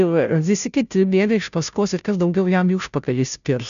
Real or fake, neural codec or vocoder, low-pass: fake; codec, 16 kHz, 0.5 kbps, FunCodec, trained on Chinese and English, 25 frames a second; 7.2 kHz